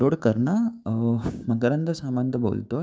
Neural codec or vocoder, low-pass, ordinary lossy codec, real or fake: codec, 16 kHz, 6 kbps, DAC; none; none; fake